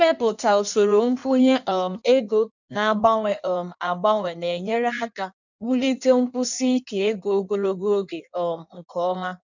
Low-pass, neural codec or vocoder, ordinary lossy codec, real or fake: 7.2 kHz; codec, 16 kHz in and 24 kHz out, 1.1 kbps, FireRedTTS-2 codec; none; fake